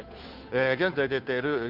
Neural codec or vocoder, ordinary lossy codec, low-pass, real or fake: codec, 16 kHz, 2 kbps, FunCodec, trained on Chinese and English, 25 frames a second; none; 5.4 kHz; fake